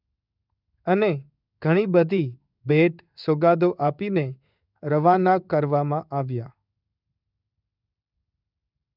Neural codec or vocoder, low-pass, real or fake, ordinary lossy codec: codec, 16 kHz in and 24 kHz out, 1 kbps, XY-Tokenizer; 5.4 kHz; fake; none